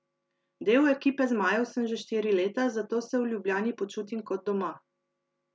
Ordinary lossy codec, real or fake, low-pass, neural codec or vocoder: none; real; none; none